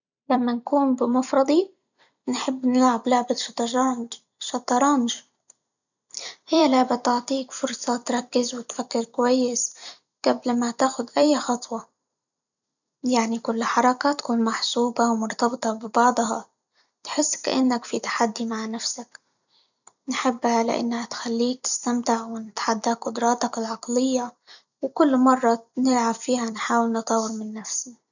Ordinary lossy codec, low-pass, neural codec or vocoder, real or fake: none; 7.2 kHz; vocoder, 44.1 kHz, 128 mel bands every 256 samples, BigVGAN v2; fake